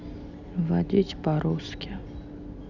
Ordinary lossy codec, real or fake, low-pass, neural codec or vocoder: none; real; 7.2 kHz; none